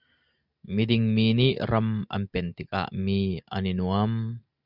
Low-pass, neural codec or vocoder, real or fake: 5.4 kHz; none; real